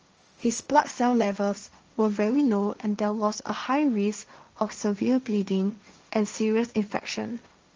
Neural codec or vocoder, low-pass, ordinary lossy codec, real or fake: codec, 16 kHz, 1.1 kbps, Voila-Tokenizer; 7.2 kHz; Opus, 24 kbps; fake